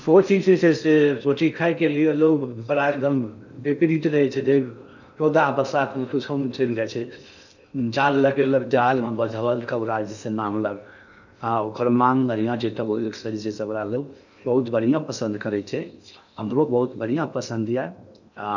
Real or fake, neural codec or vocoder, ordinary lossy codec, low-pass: fake; codec, 16 kHz in and 24 kHz out, 0.6 kbps, FocalCodec, streaming, 4096 codes; none; 7.2 kHz